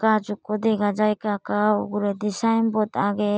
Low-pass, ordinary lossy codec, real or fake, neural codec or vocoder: none; none; real; none